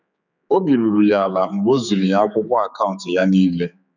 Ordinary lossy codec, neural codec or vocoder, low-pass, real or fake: none; codec, 16 kHz, 4 kbps, X-Codec, HuBERT features, trained on general audio; 7.2 kHz; fake